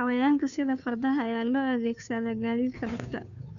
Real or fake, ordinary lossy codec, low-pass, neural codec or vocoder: fake; none; 7.2 kHz; codec, 16 kHz, 2 kbps, FunCodec, trained on Chinese and English, 25 frames a second